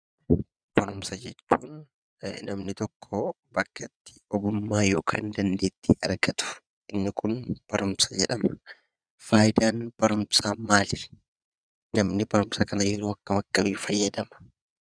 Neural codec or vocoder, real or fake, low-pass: vocoder, 22.05 kHz, 80 mel bands, Vocos; fake; 9.9 kHz